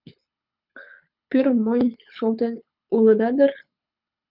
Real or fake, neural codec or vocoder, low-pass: fake; codec, 24 kHz, 6 kbps, HILCodec; 5.4 kHz